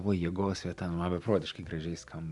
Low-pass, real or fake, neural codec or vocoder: 10.8 kHz; real; none